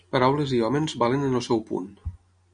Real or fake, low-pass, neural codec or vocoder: real; 9.9 kHz; none